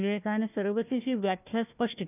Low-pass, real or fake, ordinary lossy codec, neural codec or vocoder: 3.6 kHz; fake; none; codec, 16 kHz, 1 kbps, FunCodec, trained on Chinese and English, 50 frames a second